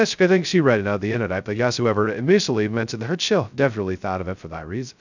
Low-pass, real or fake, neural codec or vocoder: 7.2 kHz; fake; codec, 16 kHz, 0.2 kbps, FocalCodec